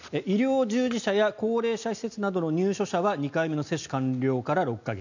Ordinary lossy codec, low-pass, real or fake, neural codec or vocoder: none; 7.2 kHz; real; none